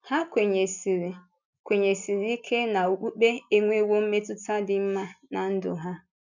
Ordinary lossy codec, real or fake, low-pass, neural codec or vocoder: none; real; 7.2 kHz; none